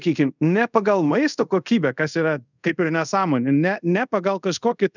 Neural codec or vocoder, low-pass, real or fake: codec, 24 kHz, 0.5 kbps, DualCodec; 7.2 kHz; fake